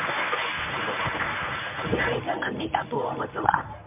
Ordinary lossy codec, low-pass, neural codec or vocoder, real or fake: MP3, 32 kbps; 3.6 kHz; codec, 24 kHz, 0.9 kbps, WavTokenizer, medium speech release version 1; fake